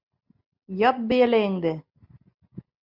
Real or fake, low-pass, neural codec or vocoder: real; 5.4 kHz; none